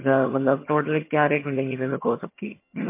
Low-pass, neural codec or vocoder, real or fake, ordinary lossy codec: 3.6 kHz; vocoder, 22.05 kHz, 80 mel bands, HiFi-GAN; fake; MP3, 24 kbps